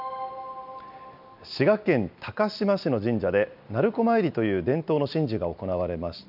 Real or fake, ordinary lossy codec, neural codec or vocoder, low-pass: real; none; none; 5.4 kHz